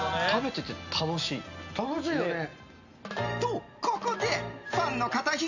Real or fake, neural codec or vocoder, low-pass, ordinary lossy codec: real; none; 7.2 kHz; none